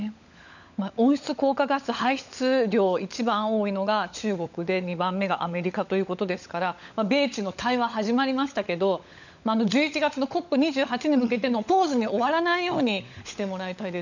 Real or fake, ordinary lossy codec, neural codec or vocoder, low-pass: fake; none; codec, 16 kHz, 16 kbps, FunCodec, trained on LibriTTS, 50 frames a second; 7.2 kHz